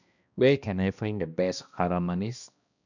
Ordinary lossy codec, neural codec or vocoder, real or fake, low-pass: none; codec, 16 kHz, 1 kbps, X-Codec, HuBERT features, trained on balanced general audio; fake; 7.2 kHz